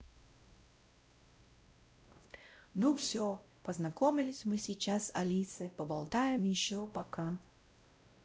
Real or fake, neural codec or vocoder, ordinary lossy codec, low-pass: fake; codec, 16 kHz, 0.5 kbps, X-Codec, WavLM features, trained on Multilingual LibriSpeech; none; none